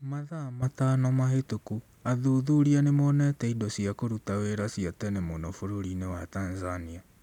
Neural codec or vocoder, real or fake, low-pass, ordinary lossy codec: none; real; 19.8 kHz; none